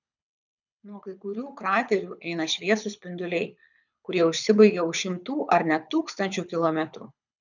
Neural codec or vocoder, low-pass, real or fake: codec, 24 kHz, 6 kbps, HILCodec; 7.2 kHz; fake